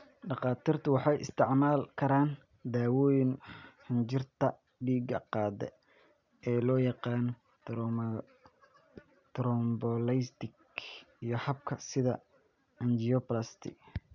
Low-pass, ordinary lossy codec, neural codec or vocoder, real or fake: 7.2 kHz; none; none; real